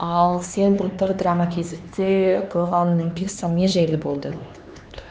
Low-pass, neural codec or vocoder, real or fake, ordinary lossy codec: none; codec, 16 kHz, 2 kbps, X-Codec, HuBERT features, trained on LibriSpeech; fake; none